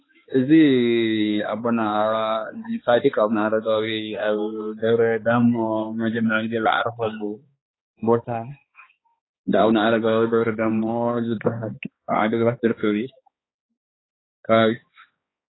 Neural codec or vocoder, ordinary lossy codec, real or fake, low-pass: codec, 16 kHz, 2 kbps, X-Codec, HuBERT features, trained on balanced general audio; AAC, 16 kbps; fake; 7.2 kHz